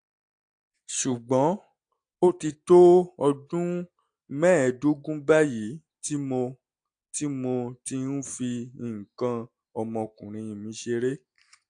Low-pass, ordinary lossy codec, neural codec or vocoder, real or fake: 9.9 kHz; AAC, 64 kbps; none; real